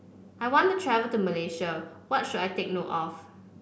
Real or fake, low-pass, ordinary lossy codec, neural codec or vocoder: real; none; none; none